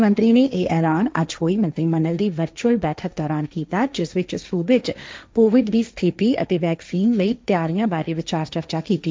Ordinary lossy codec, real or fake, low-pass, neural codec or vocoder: none; fake; none; codec, 16 kHz, 1.1 kbps, Voila-Tokenizer